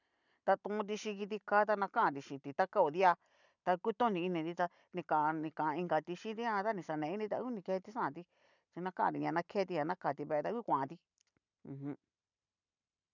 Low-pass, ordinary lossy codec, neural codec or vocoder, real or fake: 7.2 kHz; none; vocoder, 44.1 kHz, 128 mel bands every 512 samples, BigVGAN v2; fake